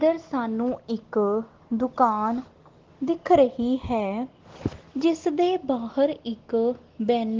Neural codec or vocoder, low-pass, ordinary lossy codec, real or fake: none; 7.2 kHz; Opus, 16 kbps; real